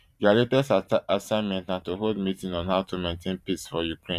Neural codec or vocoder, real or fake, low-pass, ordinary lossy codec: vocoder, 44.1 kHz, 128 mel bands every 512 samples, BigVGAN v2; fake; 14.4 kHz; none